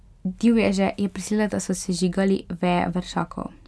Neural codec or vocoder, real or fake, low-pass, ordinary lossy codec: none; real; none; none